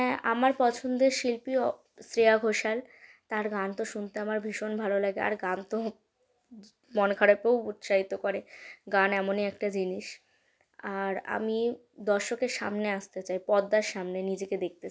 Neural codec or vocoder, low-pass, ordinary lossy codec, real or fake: none; none; none; real